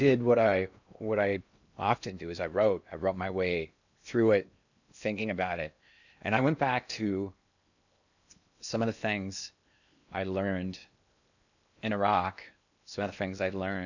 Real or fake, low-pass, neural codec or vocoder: fake; 7.2 kHz; codec, 16 kHz in and 24 kHz out, 0.8 kbps, FocalCodec, streaming, 65536 codes